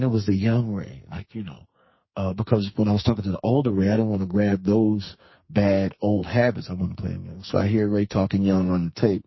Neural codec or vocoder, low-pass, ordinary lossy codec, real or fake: codec, 44.1 kHz, 2.6 kbps, SNAC; 7.2 kHz; MP3, 24 kbps; fake